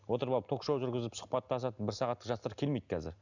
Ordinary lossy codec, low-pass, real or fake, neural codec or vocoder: none; 7.2 kHz; real; none